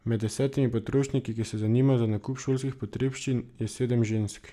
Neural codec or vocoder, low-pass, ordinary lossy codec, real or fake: none; 14.4 kHz; none; real